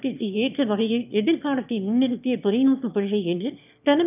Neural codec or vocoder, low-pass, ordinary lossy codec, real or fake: autoencoder, 22.05 kHz, a latent of 192 numbers a frame, VITS, trained on one speaker; 3.6 kHz; none; fake